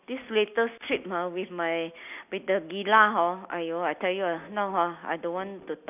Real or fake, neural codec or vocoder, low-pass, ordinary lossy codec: real; none; 3.6 kHz; none